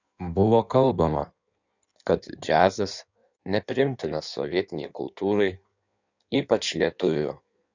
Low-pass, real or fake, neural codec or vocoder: 7.2 kHz; fake; codec, 16 kHz in and 24 kHz out, 1.1 kbps, FireRedTTS-2 codec